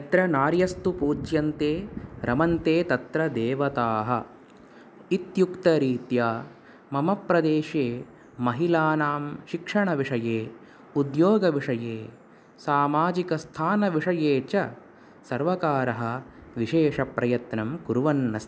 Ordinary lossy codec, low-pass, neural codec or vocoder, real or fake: none; none; none; real